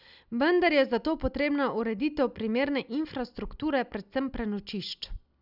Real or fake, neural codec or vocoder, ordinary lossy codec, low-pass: real; none; none; 5.4 kHz